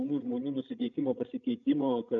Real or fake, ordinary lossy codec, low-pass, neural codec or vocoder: fake; AAC, 64 kbps; 7.2 kHz; codec, 16 kHz, 16 kbps, FreqCodec, larger model